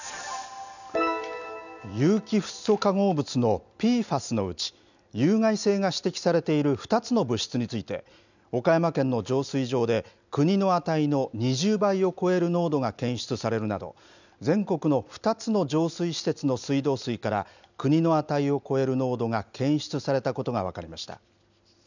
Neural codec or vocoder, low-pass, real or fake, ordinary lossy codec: none; 7.2 kHz; real; none